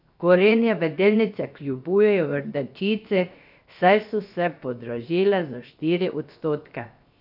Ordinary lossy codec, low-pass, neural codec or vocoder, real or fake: none; 5.4 kHz; codec, 16 kHz, 0.7 kbps, FocalCodec; fake